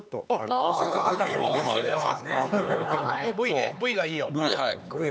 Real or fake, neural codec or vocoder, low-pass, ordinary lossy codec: fake; codec, 16 kHz, 4 kbps, X-Codec, HuBERT features, trained on LibriSpeech; none; none